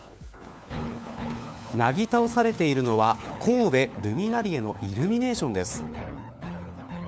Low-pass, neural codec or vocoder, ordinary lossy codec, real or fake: none; codec, 16 kHz, 4 kbps, FunCodec, trained on LibriTTS, 50 frames a second; none; fake